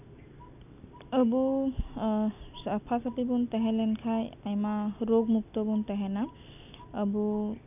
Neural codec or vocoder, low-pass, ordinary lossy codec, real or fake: none; 3.6 kHz; none; real